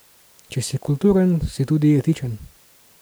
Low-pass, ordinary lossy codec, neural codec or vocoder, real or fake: none; none; none; real